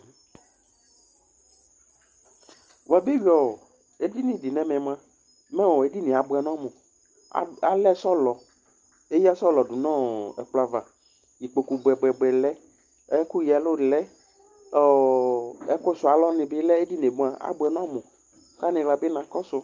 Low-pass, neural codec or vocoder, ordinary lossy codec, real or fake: 7.2 kHz; none; Opus, 24 kbps; real